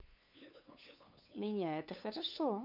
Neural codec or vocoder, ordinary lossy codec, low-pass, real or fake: codec, 16 kHz, 8 kbps, FunCodec, trained on LibriTTS, 25 frames a second; MP3, 48 kbps; 5.4 kHz; fake